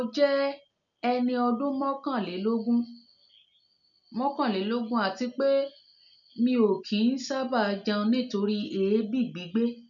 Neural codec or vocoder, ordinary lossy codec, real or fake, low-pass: none; none; real; 7.2 kHz